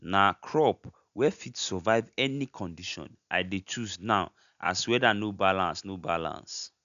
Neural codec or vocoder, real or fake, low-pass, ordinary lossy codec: none; real; 7.2 kHz; none